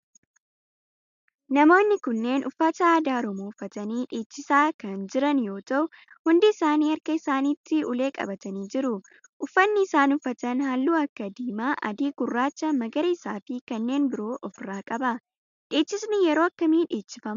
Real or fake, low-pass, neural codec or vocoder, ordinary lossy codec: real; 7.2 kHz; none; MP3, 96 kbps